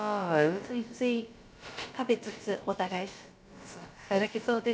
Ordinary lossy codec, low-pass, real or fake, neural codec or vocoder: none; none; fake; codec, 16 kHz, about 1 kbps, DyCAST, with the encoder's durations